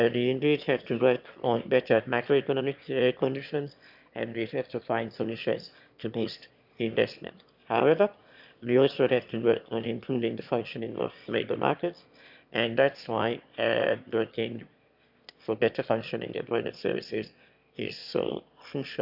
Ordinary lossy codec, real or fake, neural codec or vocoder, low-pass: none; fake; autoencoder, 22.05 kHz, a latent of 192 numbers a frame, VITS, trained on one speaker; 5.4 kHz